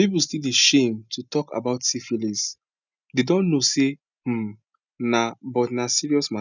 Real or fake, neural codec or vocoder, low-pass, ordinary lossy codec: real; none; 7.2 kHz; none